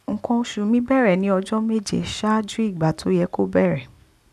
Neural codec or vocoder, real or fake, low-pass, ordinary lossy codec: none; real; 14.4 kHz; none